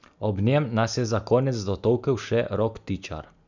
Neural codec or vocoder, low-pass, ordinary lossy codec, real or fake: none; 7.2 kHz; none; real